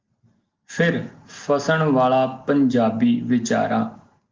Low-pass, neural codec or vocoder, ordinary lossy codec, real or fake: 7.2 kHz; none; Opus, 32 kbps; real